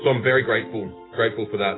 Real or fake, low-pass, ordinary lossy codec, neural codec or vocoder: real; 7.2 kHz; AAC, 16 kbps; none